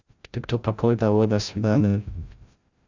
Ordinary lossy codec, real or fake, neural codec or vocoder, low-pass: Opus, 64 kbps; fake; codec, 16 kHz, 0.5 kbps, FreqCodec, larger model; 7.2 kHz